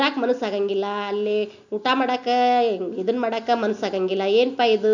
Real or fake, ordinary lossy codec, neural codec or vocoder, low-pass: real; none; none; 7.2 kHz